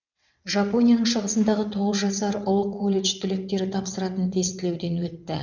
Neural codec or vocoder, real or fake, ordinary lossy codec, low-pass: vocoder, 22.05 kHz, 80 mel bands, WaveNeXt; fake; none; 7.2 kHz